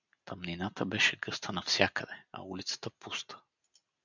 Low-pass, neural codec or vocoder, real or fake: 7.2 kHz; none; real